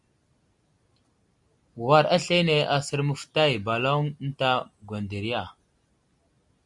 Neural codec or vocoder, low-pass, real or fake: none; 10.8 kHz; real